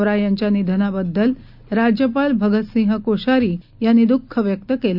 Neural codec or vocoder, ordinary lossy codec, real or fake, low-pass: none; none; real; 5.4 kHz